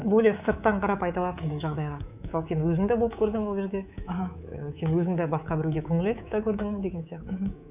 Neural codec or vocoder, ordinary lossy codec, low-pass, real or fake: codec, 24 kHz, 3.1 kbps, DualCodec; none; 3.6 kHz; fake